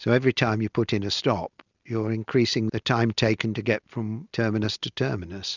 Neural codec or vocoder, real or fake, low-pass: none; real; 7.2 kHz